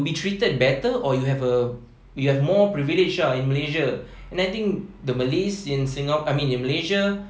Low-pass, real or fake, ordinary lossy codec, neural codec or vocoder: none; real; none; none